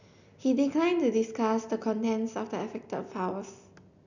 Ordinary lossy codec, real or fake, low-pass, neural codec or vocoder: none; real; 7.2 kHz; none